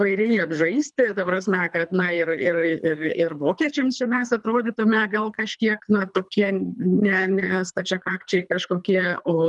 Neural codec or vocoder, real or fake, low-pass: codec, 24 kHz, 3 kbps, HILCodec; fake; 10.8 kHz